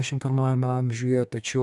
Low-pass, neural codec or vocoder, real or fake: 10.8 kHz; codec, 32 kHz, 1.9 kbps, SNAC; fake